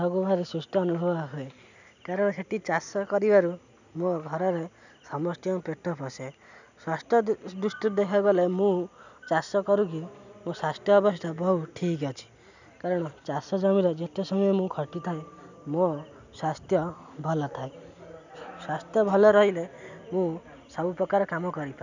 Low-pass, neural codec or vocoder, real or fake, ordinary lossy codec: 7.2 kHz; none; real; none